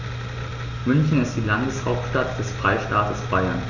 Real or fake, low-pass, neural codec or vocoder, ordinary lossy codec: real; 7.2 kHz; none; none